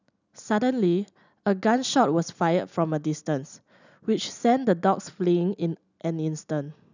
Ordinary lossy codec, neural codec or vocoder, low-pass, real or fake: none; none; 7.2 kHz; real